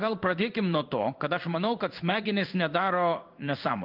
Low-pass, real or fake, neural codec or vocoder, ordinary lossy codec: 5.4 kHz; fake; codec, 16 kHz in and 24 kHz out, 1 kbps, XY-Tokenizer; Opus, 24 kbps